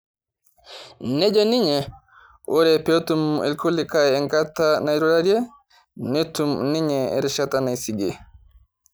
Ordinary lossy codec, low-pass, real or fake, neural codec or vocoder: none; none; real; none